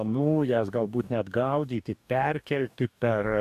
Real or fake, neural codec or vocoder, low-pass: fake; codec, 44.1 kHz, 2.6 kbps, DAC; 14.4 kHz